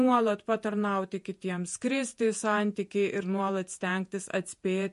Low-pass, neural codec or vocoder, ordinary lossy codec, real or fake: 14.4 kHz; vocoder, 48 kHz, 128 mel bands, Vocos; MP3, 48 kbps; fake